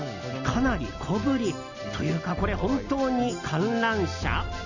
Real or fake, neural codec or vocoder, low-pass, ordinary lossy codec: real; none; 7.2 kHz; none